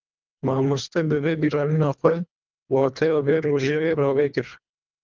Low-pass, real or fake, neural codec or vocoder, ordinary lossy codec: 7.2 kHz; fake; codec, 24 kHz, 1.5 kbps, HILCodec; Opus, 24 kbps